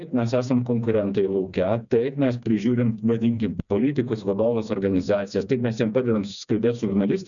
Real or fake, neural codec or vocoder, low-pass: fake; codec, 16 kHz, 2 kbps, FreqCodec, smaller model; 7.2 kHz